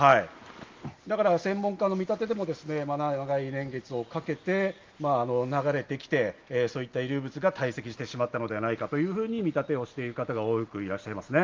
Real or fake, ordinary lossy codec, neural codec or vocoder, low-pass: real; Opus, 24 kbps; none; 7.2 kHz